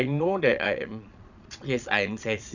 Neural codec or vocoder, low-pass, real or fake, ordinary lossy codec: vocoder, 22.05 kHz, 80 mel bands, Vocos; 7.2 kHz; fake; none